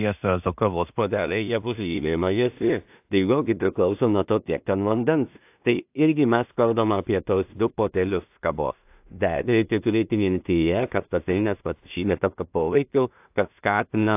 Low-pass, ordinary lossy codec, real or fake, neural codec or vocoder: 3.6 kHz; AAC, 32 kbps; fake; codec, 16 kHz in and 24 kHz out, 0.4 kbps, LongCat-Audio-Codec, two codebook decoder